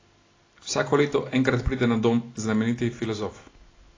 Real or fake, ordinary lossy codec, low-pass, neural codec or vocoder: real; AAC, 32 kbps; 7.2 kHz; none